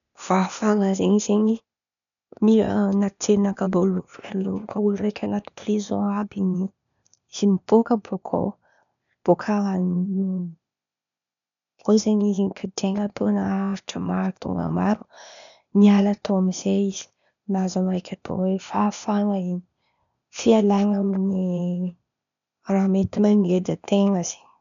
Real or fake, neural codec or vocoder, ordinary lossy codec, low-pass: fake; codec, 16 kHz, 0.8 kbps, ZipCodec; none; 7.2 kHz